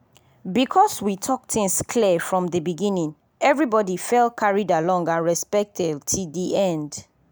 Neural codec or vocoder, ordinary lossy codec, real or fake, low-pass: none; none; real; none